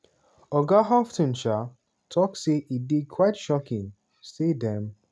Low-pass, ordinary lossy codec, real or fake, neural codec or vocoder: none; none; real; none